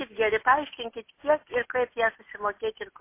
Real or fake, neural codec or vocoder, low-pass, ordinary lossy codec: real; none; 3.6 kHz; MP3, 24 kbps